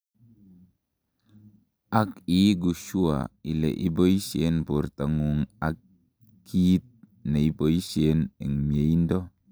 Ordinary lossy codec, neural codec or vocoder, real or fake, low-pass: none; none; real; none